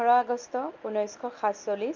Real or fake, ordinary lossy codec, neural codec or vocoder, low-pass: real; Opus, 24 kbps; none; 7.2 kHz